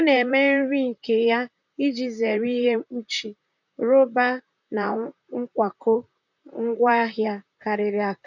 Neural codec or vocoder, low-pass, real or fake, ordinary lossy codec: vocoder, 44.1 kHz, 128 mel bands, Pupu-Vocoder; 7.2 kHz; fake; none